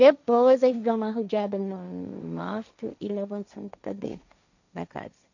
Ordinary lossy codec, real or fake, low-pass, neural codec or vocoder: none; fake; 7.2 kHz; codec, 16 kHz, 1.1 kbps, Voila-Tokenizer